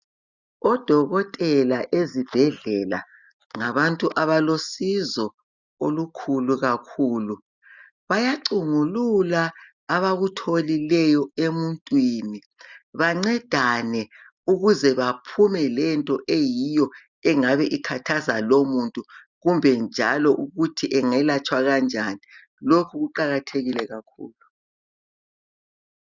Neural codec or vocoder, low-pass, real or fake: none; 7.2 kHz; real